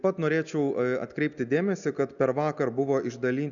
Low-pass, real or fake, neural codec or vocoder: 7.2 kHz; real; none